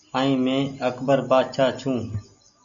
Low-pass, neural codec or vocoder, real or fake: 7.2 kHz; none; real